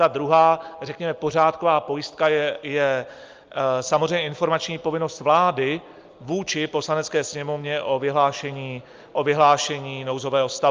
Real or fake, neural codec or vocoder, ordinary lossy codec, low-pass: real; none; Opus, 24 kbps; 7.2 kHz